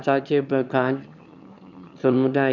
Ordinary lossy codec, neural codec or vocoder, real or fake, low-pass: none; autoencoder, 22.05 kHz, a latent of 192 numbers a frame, VITS, trained on one speaker; fake; 7.2 kHz